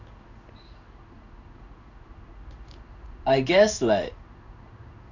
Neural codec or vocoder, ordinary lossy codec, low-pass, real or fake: codec, 16 kHz in and 24 kHz out, 1 kbps, XY-Tokenizer; none; 7.2 kHz; fake